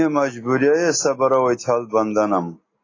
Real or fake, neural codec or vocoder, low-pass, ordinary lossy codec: real; none; 7.2 kHz; AAC, 32 kbps